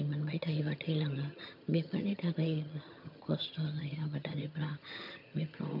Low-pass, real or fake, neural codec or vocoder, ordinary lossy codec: 5.4 kHz; fake; vocoder, 22.05 kHz, 80 mel bands, HiFi-GAN; none